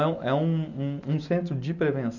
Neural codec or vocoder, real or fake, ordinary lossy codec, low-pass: none; real; none; 7.2 kHz